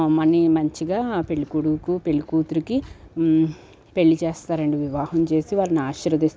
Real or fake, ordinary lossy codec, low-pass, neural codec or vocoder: real; none; none; none